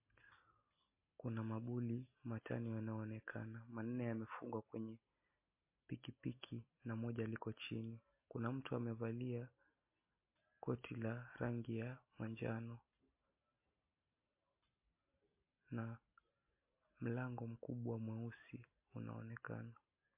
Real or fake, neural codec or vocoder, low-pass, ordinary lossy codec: real; none; 3.6 kHz; MP3, 24 kbps